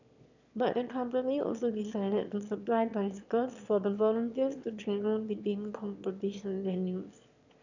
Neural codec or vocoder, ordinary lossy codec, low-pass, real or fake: autoencoder, 22.05 kHz, a latent of 192 numbers a frame, VITS, trained on one speaker; none; 7.2 kHz; fake